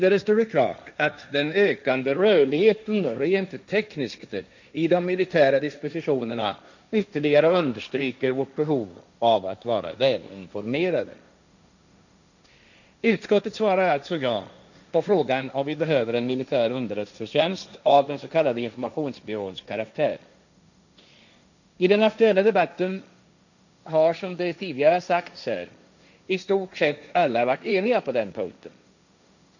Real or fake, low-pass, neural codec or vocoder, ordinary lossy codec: fake; 7.2 kHz; codec, 16 kHz, 1.1 kbps, Voila-Tokenizer; none